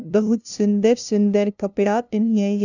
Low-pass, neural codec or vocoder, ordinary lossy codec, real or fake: 7.2 kHz; codec, 16 kHz, 0.5 kbps, FunCodec, trained on LibriTTS, 25 frames a second; none; fake